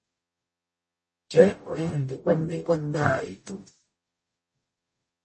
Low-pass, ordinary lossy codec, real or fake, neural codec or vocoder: 10.8 kHz; MP3, 32 kbps; fake; codec, 44.1 kHz, 0.9 kbps, DAC